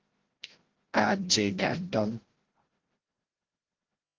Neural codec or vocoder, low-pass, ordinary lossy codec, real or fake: codec, 16 kHz, 0.5 kbps, FreqCodec, larger model; 7.2 kHz; Opus, 16 kbps; fake